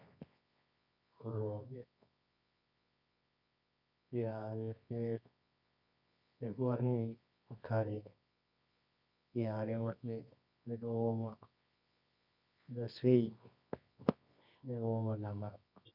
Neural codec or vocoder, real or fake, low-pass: codec, 24 kHz, 0.9 kbps, WavTokenizer, medium music audio release; fake; 5.4 kHz